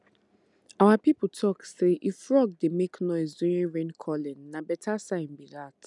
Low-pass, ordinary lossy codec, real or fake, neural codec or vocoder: 10.8 kHz; none; real; none